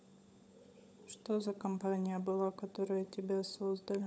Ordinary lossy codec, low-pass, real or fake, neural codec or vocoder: none; none; fake; codec, 16 kHz, 16 kbps, FunCodec, trained on LibriTTS, 50 frames a second